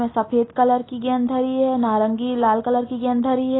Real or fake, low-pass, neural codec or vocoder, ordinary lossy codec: real; 7.2 kHz; none; AAC, 16 kbps